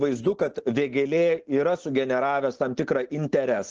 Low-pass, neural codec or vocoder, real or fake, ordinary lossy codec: 7.2 kHz; none; real; Opus, 16 kbps